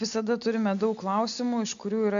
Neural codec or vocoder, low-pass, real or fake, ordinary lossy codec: none; 7.2 kHz; real; MP3, 64 kbps